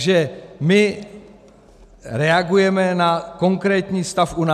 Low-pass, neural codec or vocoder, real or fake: 14.4 kHz; none; real